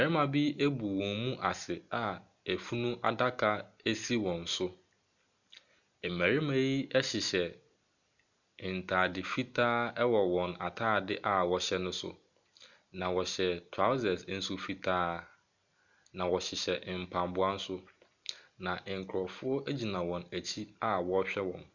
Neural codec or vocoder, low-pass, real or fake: none; 7.2 kHz; real